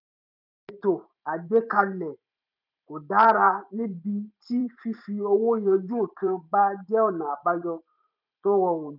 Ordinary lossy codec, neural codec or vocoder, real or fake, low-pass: none; vocoder, 44.1 kHz, 128 mel bands every 512 samples, BigVGAN v2; fake; 5.4 kHz